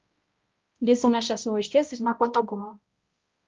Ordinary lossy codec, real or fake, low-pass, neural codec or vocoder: Opus, 32 kbps; fake; 7.2 kHz; codec, 16 kHz, 0.5 kbps, X-Codec, HuBERT features, trained on balanced general audio